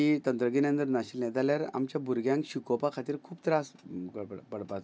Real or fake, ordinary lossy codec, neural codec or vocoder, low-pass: real; none; none; none